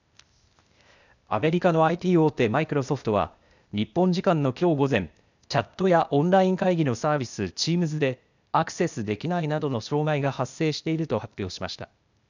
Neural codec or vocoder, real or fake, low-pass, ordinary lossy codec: codec, 16 kHz, 0.8 kbps, ZipCodec; fake; 7.2 kHz; none